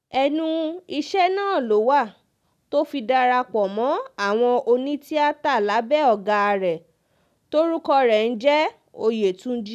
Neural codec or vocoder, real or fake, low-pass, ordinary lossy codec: none; real; 14.4 kHz; none